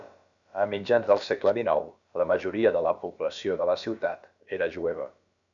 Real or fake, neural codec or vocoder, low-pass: fake; codec, 16 kHz, about 1 kbps, DyCAST, with the encoder's durations; 7.2 kHz